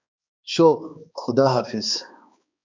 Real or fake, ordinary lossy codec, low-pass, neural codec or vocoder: fake; MP3, 64 kbps; 7.2 kHz; codec, 16 kHz, 2 kbps, X-Codec, HuBERT features, trained on balanced general audio